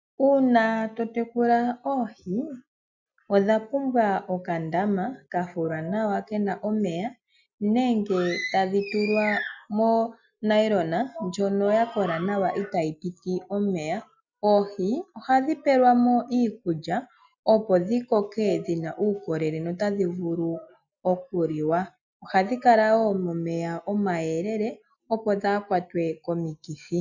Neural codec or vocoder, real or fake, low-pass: none; real; 7.2 kHz